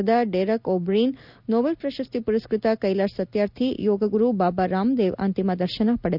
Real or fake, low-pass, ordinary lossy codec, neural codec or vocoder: real; 5.4 kHz; none; none